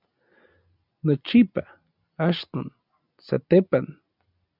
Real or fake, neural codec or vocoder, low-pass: real; none; 5.4 kHz